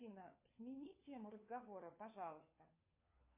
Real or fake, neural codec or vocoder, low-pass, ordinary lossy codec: fake; codec, 16 kHz, 4 kbps, FunCodec, trained on LibriTTS, 50 frames a second; 3.6 kHz; MP3, 24 kbps